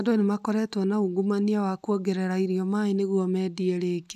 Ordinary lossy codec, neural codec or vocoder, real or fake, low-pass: none; none; real; 14.4 kHz